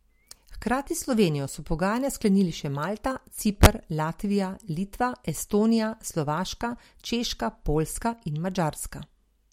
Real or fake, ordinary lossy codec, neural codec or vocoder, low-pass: real; MP3, 64 kbps; none; 19.8 kHz